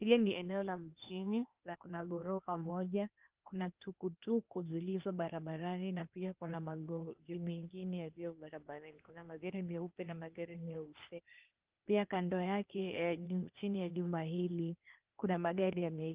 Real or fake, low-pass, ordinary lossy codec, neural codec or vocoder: fake; 3.6 kHz; Opus, 24 kbps; codec, 16 kHz, 0.8 kbps, ZipCodec